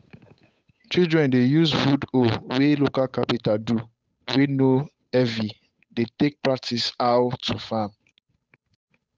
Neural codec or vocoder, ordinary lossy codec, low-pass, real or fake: codec, 16 kHz, 8 kbps, FunCodec, trained on Chinese and English, 25 frames a second; none; none; fake